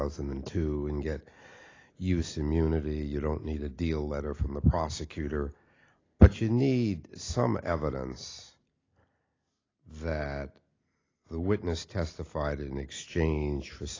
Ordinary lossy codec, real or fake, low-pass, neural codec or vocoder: AAC, 32 kbps; real; 7.2 kHz; none